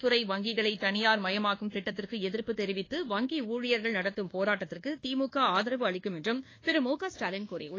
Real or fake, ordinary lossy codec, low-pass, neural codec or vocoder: fake; AAC, 32 kbps; 7.2 kHz; codec, 24 kHz, 1.2 kbps, DualCodec